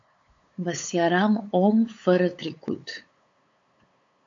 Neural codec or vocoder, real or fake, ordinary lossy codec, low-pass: codec, 16 kHz, 8 kbps, FunCodec, trained on LibriTTS, 25 frames a second; fake; AAC, 48 kbps; 7.2 kHz